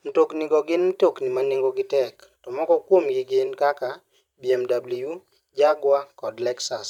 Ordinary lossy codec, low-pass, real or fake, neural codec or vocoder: none; 19.8 kHz; fake; vocoder, 44.1 kHz, 128 mel bands every 512 samples, BigVGAN v2